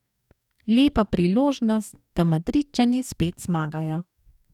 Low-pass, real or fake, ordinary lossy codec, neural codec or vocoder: 19.8 kHz; fake; none; codec, 44.1 kHz, 2.6 kbps, DAC